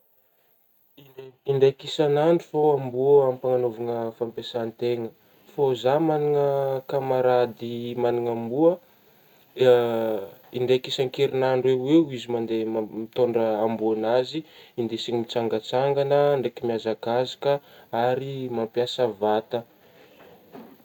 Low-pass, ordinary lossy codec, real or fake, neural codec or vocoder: 19.8 kHz; none; real; none